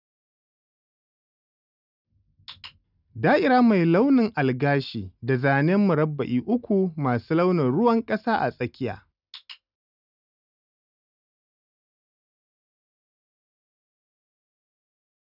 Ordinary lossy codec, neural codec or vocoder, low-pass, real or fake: none; none; 5.4 kHz; real